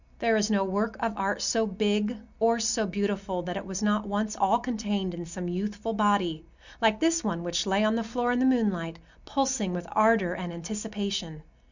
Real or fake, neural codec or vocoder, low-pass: real; none; 7.2 kHz